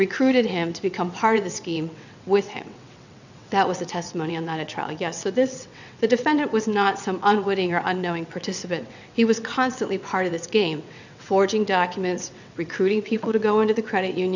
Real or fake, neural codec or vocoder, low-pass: fake; vocoder, 22.05 kHz, 80 mel bands, WaveNeXt; 7.2 kHz